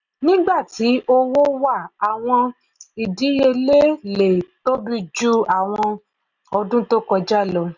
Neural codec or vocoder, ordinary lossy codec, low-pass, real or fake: none; none; 7.2 kHz; real